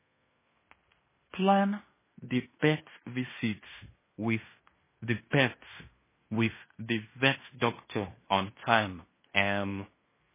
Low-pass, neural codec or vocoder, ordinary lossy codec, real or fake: 3.6 kHz; codec, 16 kHz in and 24 kHz out, 0.9 kbps, LongCat-Audio-Codec, fine tuned four codebook decoder; MP3, 16 kbps; fake